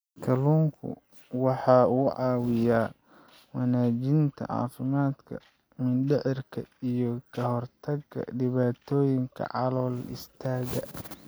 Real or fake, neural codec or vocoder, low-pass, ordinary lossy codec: real; none; none; none